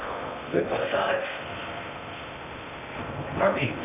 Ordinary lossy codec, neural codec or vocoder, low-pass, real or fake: none; codec, 16 kHz in and 24 kHz out, 0.6 kbps, FocalCodec, streaming, 2048 codes; 3.6 kHz; fake